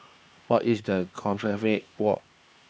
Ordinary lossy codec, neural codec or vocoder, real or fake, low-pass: none; codec, 16 kHz, 2 kbps, X-Codec, HuBERT features, trained on LibriSpeech; fake; none